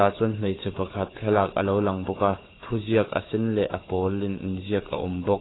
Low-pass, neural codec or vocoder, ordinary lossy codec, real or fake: 7.2 kHz; codec, 16 kHz, 4 kbps, FunCodec, trained on Chinese and English, 50 frames a second; AAC, 16 kbps; fake